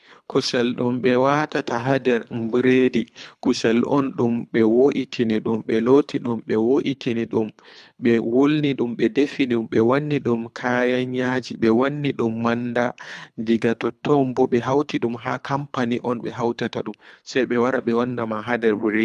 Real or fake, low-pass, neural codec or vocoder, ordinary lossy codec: fake; none; codec, 24 kHz, 3 kbps, HILCodec; none